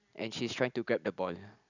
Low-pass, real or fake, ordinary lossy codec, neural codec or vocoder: 7.2 kHz; real; none; none